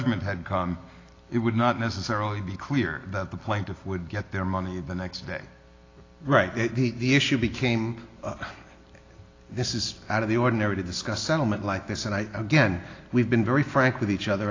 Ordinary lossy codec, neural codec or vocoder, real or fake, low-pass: AAC, 32 kbps; none; real; 7.2 kHz